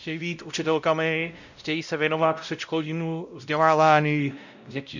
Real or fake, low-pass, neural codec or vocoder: fake; 7.2 kHz; codec, 16 kHz, 0.5 kbps, X-Codec, WavLM features, trained on Multilingual LibriSpeech